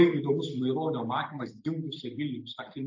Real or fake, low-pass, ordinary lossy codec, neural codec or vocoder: real; 7.2 kHz; AAC, 48 kbps; none